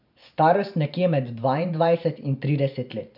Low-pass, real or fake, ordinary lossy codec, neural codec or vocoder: 5.4 kHz; fake; none; vocoder, 44.1 kHz, 128 mel bands every 512 samples, BigVGAN v2